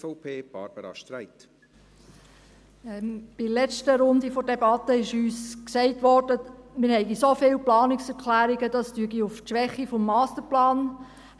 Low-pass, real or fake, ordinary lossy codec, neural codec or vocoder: none; real; none; none